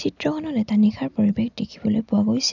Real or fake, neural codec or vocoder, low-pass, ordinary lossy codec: real; none; 7.2 kHz; none